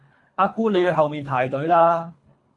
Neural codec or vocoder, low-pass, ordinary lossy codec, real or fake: codec, 24 kHz, 3 kbps, HILCodec; 10.8 kHz; AAC, 48 kbps; fake